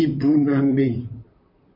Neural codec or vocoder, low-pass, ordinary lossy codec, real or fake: vocoder, 44.1 kHz, 128 mel bands, Pupu-Vocoder; 5.4 kHz; MP3, 32 kbps; fake